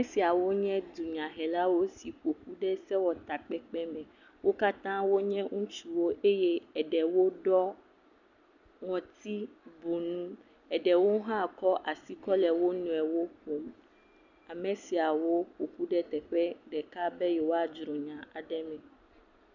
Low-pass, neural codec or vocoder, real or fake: 7.2 kHz; none; real